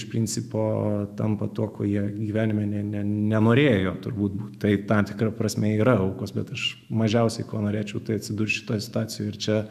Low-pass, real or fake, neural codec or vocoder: 14.4 kHz; fake; autoencoder, 48 kHz, 128 numbers a frame, DAC-VAE, trained on Japanese speech